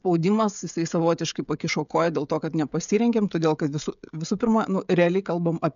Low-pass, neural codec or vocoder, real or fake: 7.2 kHz; codec, 16 kHz, 16 kbps, FreqCodec, smaller model; fake